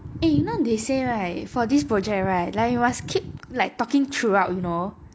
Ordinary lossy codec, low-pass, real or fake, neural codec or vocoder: none; none; real; none